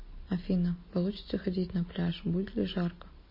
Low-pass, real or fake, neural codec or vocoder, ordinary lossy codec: 5.4 kHz; real; none; MP3, 24 kbps